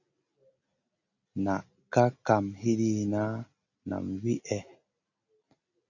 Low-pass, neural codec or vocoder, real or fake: 7.2 kHz; none; real